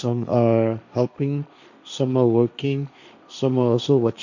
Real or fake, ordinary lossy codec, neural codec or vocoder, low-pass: fake; none; codec, 16 kHz, 1.1 kbps, Voila-Tokenizer; 7.2 kHz